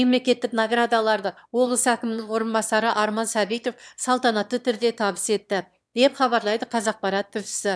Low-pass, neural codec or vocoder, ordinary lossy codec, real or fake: none; autoencoder, 22.05 kHz, a latent of 192 numbers a frame, VITS, trained on one speaker; none; fake